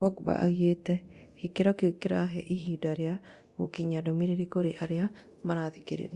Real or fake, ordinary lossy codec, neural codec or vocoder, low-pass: fake; Opus, 64 kbps; codec, 24 kHz, 0.9 kbps, DualCodec; 10.8 kHz